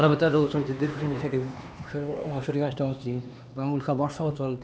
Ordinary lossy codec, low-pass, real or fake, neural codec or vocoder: none; none; fake; codec, 16 kHz, 2 kbps, X-Codec, HuBERT features, trained on LibriSpeech